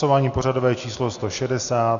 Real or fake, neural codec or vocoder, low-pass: real; none; 7.2 kHz